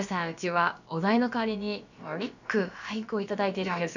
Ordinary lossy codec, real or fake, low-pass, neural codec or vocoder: none; fake; 7.2 kHz; codec, 16 kHz, about 1 kbps, DyCAST, with the encoder's durations